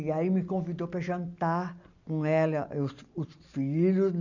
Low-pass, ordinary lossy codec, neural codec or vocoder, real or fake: 7.2 kHz; none; none; real